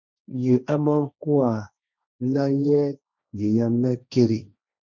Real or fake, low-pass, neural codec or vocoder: fake; 7.2 kHz; codec, 16 kHz, 1.1 kbps, Voila-Tokenizer